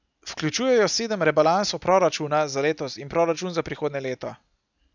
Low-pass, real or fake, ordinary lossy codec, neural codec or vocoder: 7.2 kHz; real; none; none